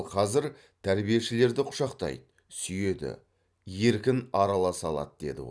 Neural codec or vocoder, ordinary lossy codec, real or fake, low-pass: none; none; real; none